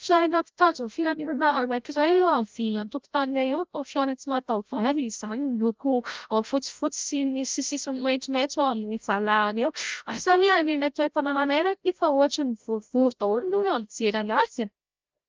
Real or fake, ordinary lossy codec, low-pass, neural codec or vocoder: fake; Opus, 24 kbps; 7.2 kHz; codec, 16 kHz, 0.5 kbps, FreqCodec, larger model